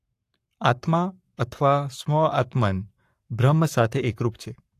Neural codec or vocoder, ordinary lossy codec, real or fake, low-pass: codec, 44.1 kHz, 7.8 kbps, Pupu-Codec; AAC, 64 kbps; fake; 14.4 kHz